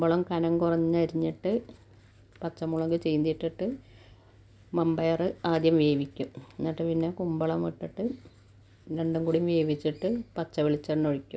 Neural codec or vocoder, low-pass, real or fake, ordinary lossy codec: none; none; real; none